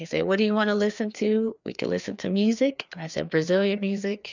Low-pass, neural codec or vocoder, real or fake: 7.2 kHz; codec, 16 kHz, 2 kbps, FreqCodec, larger model; fake